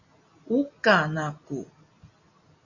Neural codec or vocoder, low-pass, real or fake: none; 7.2 kHz; real